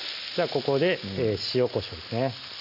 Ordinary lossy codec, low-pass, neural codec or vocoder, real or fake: none; 5.4 kHz; none; real